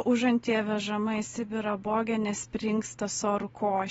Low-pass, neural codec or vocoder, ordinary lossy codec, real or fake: 19.8 kHz; none; AAC, 24 kbps; real